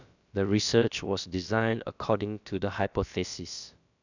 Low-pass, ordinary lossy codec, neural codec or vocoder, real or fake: 7.2 kHz; none; codec, 16 kHz, about 1 kbps, DyCAST, with the encoder's durations; fake